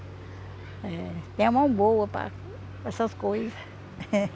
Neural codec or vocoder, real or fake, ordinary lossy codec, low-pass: none; real; none; none